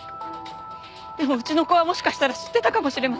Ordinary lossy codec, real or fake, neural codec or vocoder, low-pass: none; real; none; none